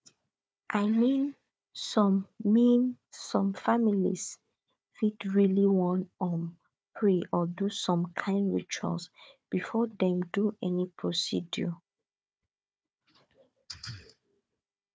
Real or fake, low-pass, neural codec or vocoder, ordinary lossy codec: fake; none; codec, 16 kHz, 4 kbps, FunCodec, trained on Chinese and English, 50 frames a second; none